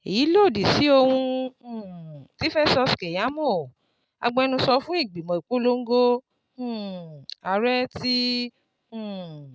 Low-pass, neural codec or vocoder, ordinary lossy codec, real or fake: none; none; none; real